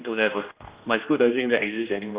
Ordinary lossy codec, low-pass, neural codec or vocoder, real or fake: Opus, 64 kbps; 3.6 kHz; codec, 16 kHz, 1 kbps, X-Codec, HuBERT features, trained on general audio; fake